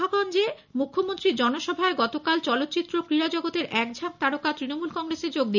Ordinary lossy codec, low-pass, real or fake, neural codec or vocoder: none; 7.2 kHz; real; none